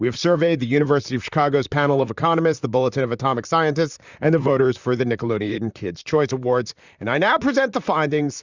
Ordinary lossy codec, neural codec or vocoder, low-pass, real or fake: Opus, 64 kbps; vocoder, 44.1 kHz, 128 mel bands, Pupu-Vocoder; 7.2 kHz; fake